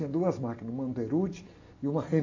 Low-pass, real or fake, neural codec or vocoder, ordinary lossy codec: 7.2 kHz; real; none; AAC, 32 kbps